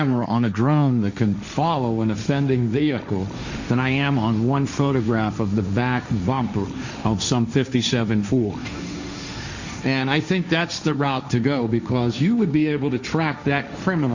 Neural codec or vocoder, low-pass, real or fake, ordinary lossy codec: codec, 16 kHz, 1.1 kbps, Voila-Tokenizer; 7.2 kHz; fake; Opus, 64 kbps